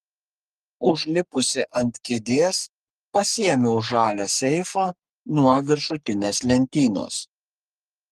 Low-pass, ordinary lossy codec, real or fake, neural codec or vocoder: 14.4 kHz; Opus, 32 kbps; fake; codec, 44.1 kHz, 3.4 kbps, Pupu-Codec